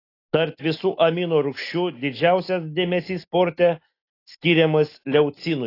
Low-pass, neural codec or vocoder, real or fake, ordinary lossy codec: 5.4 kHz; none; real; AAC, 32 kbps